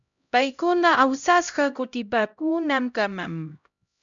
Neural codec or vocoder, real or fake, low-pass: codec, 16 kHz, 0.5 kbps, X-Codec, HuBERT features, trained on LibriSpeech; fake; 7.2 kHz